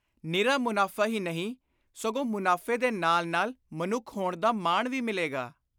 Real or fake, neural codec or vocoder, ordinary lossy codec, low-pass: real; none; none; none